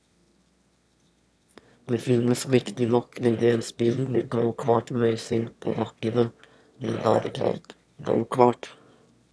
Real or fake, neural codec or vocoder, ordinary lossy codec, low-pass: fake; autoencoder, 22.05 kHz, a latent of 192 numbers a frame, VITS, trained on one speaker; none; none